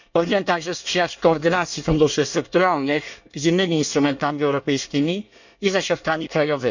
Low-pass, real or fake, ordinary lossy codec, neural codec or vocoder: 7.2 kHz; fake; none; codec, 24 kHz, 1 kbps, SNAC